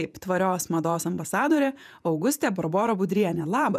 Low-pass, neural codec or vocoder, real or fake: 14.4 kHz; vocoder, 48 kHz, 128 mel bands, Vocos; fake